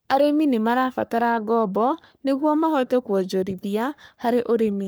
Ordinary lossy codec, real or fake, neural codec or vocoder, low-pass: none; fake; codec, 44.1 kHz, 3.4 kbps, Pupu-Codec; none